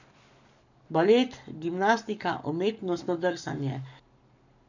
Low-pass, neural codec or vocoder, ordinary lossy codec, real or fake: 7.2 kHz; codec, 44.1 kHz, 7.8 kbps, Pupu-Codec; none; fake